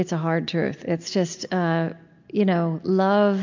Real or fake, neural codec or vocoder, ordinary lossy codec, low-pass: real; none; MP3, 64 kbps; 7.2 kHz